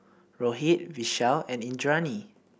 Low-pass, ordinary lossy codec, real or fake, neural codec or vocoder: none; none; real; none